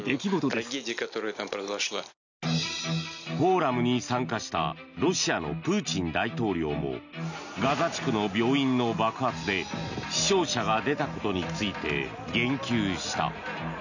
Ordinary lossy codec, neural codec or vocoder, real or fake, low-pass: none; none; real; 7.2 kHz